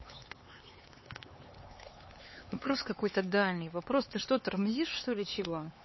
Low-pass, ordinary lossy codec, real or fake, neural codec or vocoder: 7.2 kHz; MP3, 24 kbps; fake; codec, 16 kHz, 4 kbps, X-Codec, HuBERT features, trained on LibriSpeech